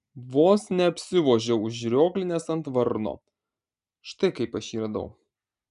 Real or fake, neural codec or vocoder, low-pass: real; none; 10.8 kHz